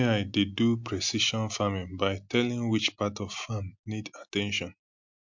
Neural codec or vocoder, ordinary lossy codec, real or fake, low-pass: none; MP3, 64 kbps; real; 7.2 kHz